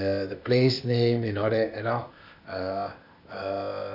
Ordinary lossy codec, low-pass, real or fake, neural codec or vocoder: none; 5.4 kHz; fake; codec, 16 kHz, 0.8 kbps, ZipCodec